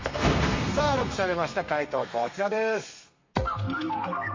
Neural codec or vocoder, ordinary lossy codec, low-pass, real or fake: codec, 32 kHz, 1.9 kbps, SNAC; MP3, 32 kbps; 7.2 kHz; fake